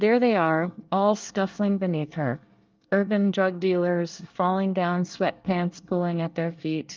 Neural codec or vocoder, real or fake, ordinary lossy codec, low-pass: codec, 24 kHz, 1 kbps, SNAC; fake; Opus, 24 kbps; 7.2 kHz